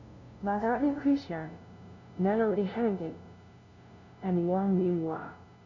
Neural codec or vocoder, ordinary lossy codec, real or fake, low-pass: codec, 16 kHz, 0.5 kbps, FunCodec, trained on LibriTTS, 25 frames a second; none; fake; 7.2 kHz